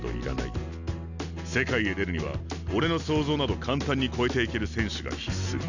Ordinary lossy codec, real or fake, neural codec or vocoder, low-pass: none; real; none; 7.2 kHz